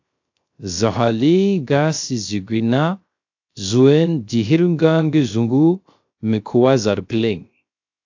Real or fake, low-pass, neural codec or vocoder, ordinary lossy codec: fake; 7.2 kHz; codec, 16 kHz, 0.3 kbps, FocalCodec; AAC, 48 kbps